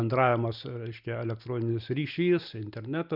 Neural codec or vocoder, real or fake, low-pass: none; real; 5.4 kHz